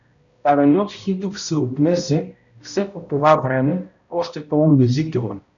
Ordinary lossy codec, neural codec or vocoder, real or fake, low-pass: MP3, 96 kbps; codec, 16 kHz, 0.5 kbps, X-Codec, HuBERT features, trained on balanced general audio; fake; 7.2 kHz